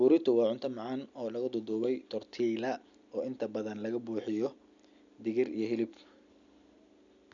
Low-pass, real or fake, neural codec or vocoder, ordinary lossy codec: 7.2 kHz; real; none; none